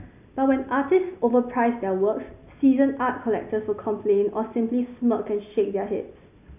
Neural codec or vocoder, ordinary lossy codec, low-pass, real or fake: none; none; 3.6 kHz; real